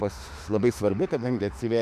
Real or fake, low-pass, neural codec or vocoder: fake; 14.4 kHz; autoencoder, 48 kHz, 32 numbers a frame, DAC-VAE, trained on Japanese speech